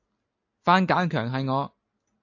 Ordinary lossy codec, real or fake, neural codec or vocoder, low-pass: AAC, 48 kbps; real; none; 7.2 kHz